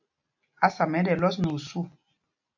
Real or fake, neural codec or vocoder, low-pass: real; none; 7.2 kHz